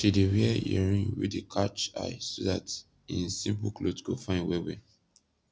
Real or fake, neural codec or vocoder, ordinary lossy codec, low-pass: real; none; none; none